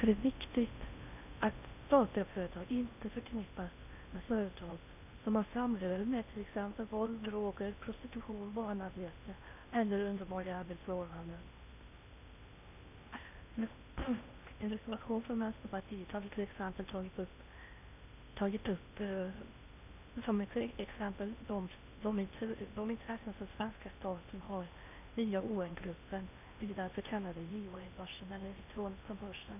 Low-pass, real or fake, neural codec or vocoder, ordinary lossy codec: 3.6 kHz; fake; codec, 16 kHz in and 24 kHz out, 0.6 kbps, FocalCodec, streaming, 4096 codes; none